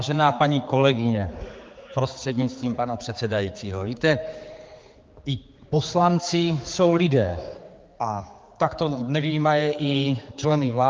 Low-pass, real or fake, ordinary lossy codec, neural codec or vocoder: 7.2 kHz; fake; Opus, 24 kbps; codec, 16 kHz, 4 kbps, X-Codec, HuBERT features, trained on general audio